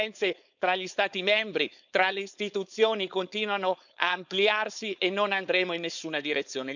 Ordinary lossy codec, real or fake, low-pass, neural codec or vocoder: none; fake; 7.2 kHz; codec, 16 kHz, 4.8 kbps, FACodec